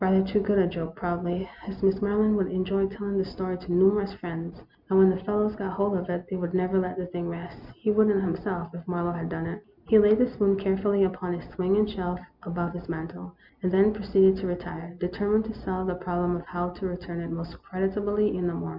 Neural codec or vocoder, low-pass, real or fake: none; 5.4 kHz; real